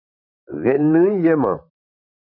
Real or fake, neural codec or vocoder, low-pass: fake; vocoder, 44.1 kHz, 128 mel bands, Pupu-Vocoder; 5.4 kHz